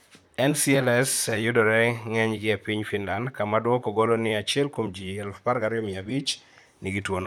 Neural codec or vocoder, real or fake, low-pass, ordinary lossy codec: vocoder, 44.1 kHz, 128 mel bands, Pupu-Vocoder; fake; 19.8 kHz; none